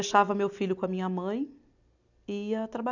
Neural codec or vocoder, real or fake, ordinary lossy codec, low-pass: none; real; none; 7.2 kHz